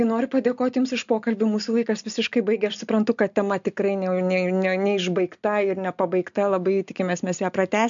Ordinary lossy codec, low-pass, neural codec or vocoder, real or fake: MP3, 64 kbps; 7.2 kHz; none; real